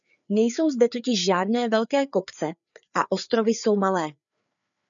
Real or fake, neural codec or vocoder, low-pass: fake; codec, 16 kHz, 4 kbps, FreqCodec, larger model; 7.2 kHz